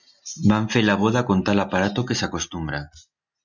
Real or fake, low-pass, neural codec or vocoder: real; 7.2 kHz; none